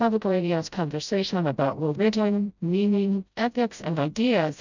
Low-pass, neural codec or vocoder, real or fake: 7.2 kHz; codec, 16 kHz, 0.5 kbps, FreqCodec, smaller model; fake